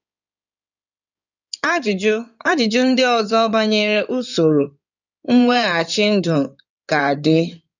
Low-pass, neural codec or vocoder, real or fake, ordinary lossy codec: 7.2 kHz; codec, 16 kHz in and 24 kHz out, 2.2 kbps, FireRedTTS-2 codec; fake; none